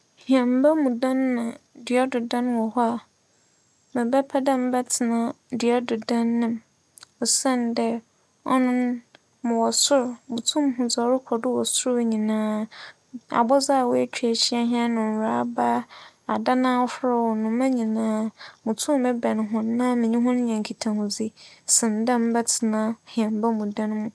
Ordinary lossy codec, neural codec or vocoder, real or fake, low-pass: none; none; real; none